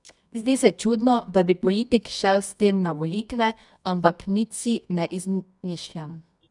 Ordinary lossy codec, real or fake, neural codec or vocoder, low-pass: none; fake; codec, 24 kHz, 0.9 kbps, WavTokenizer, medium music audio release; 10.8 kHz